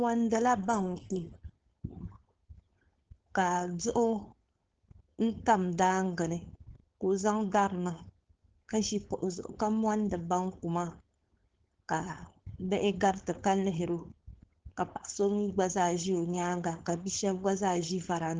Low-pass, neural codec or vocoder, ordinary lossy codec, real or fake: 7.2 kHz; codec, 16 kHz, 4.8 kbps, FACodec; Opus, 16 kbps; fake